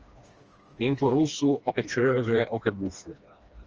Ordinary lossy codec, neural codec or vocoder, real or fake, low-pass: Opus, 24 kbps; codec, 16 kHz, 2 kbps, FreqCodec, smaller model; fake; 7.2 kHz